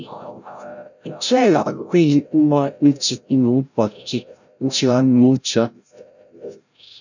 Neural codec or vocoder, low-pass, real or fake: codec, 16 kHz, 0.5 kbps, FreqCodec, larger model; 7.2 kHz; fake